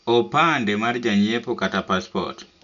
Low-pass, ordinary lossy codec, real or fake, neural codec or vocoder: 7.2 kHz; none; real; none